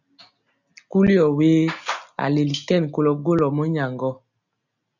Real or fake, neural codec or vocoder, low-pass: real; none; 7.2 kHz